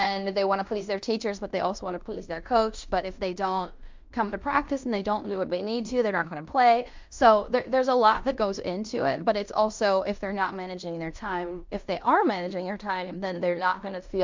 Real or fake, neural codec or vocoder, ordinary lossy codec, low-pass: fake; codec, 16 kHz in and 24 kHz out, 0.9 kbps, LongCat-Audio-Codec, fine tuned four codebook decoder; MP3, 64 kbps; 7.2 kHz